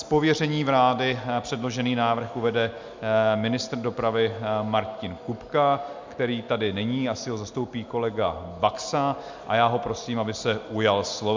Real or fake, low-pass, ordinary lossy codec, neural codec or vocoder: real; 7.2 kHz; MP3, 64 kbps; none